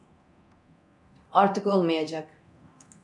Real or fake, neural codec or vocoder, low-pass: fake; codec, 24 kHz, 0.9 kbps, DualCodec; 10.8 kHz